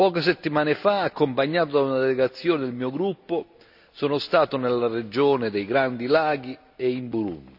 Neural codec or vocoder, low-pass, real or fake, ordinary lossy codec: none; 5.4 kHz; real; none